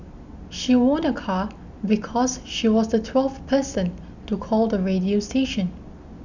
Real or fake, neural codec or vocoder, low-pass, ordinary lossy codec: real; none; 7.2 kHz; none